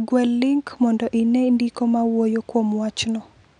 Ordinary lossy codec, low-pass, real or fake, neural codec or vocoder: none; 9.9 kHz; real; none